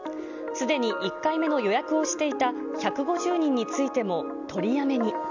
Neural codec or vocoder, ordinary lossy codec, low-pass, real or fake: none; none; 7.2 kHz; real